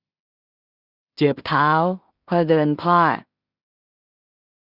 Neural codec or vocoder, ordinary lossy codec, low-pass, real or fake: codec, 16 kHz in and 24 kHz out, 0.4 kbps, LongCat-Audio-Codec, two codebook decoder; Opus, 64 kbps; 5.4 kHz; fake